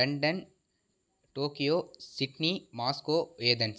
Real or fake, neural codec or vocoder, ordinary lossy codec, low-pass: real; none; none; none